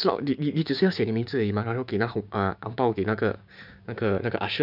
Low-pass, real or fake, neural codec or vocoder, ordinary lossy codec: 5.4 kHz; fake; codec, 16 kHz, 6 kbps, DAC; none